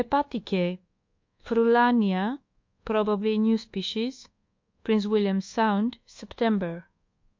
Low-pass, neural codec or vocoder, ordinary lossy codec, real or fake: 7.2 kHz; codec, 24 kHz, 1.2 kbps, DualCodec; MP3, 48 kbps; fake